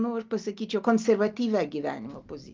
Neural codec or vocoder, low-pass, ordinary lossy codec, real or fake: none; 7.2 kHz; Opus, 24 kbps; real